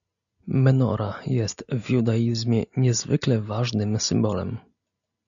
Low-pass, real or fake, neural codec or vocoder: 7.2 kHz; real; none